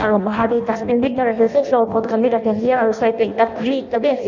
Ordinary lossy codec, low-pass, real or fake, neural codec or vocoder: none; 7.2 kHz; fake; codec, 16 kHz in and 24 kHz out, 0.6 kbps, FireRedTTS-2 codec